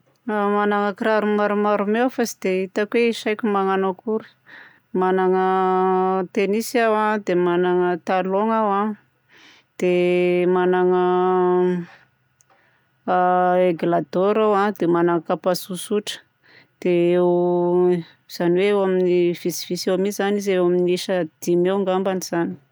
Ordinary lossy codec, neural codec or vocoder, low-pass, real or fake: none; none; none; real